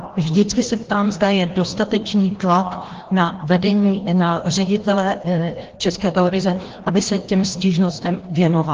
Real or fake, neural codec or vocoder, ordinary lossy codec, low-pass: fake; codec, 16 kHz, 1 kbps, FreqCodec, larger model; Opus, 16 kbps; 7.2 kHz